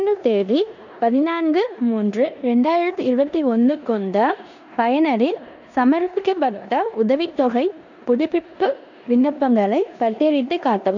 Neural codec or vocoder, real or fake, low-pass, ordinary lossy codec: codec, 16 kHz in and 24 kHz out, 0.9 kbps, LongCat-Audio-Codec, four codebook decoder; fake; 7.2 kHz; none